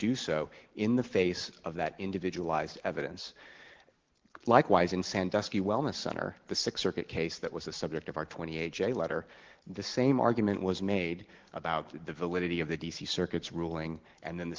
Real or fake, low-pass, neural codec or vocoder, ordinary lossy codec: real; 7.2 kHz; none; Opus, 16 kbps